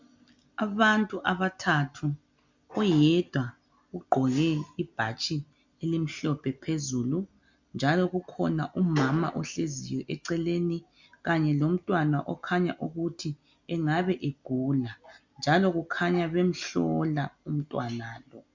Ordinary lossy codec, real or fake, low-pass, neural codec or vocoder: MP3, 64 kbps; real; 7.2 kHz; none